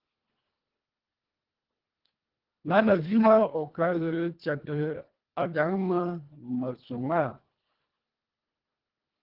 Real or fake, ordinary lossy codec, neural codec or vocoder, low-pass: fake; Opus, 16 kbps; codec, 24 kHz, 1.5 kbps, HILCodec; 5.4 kHz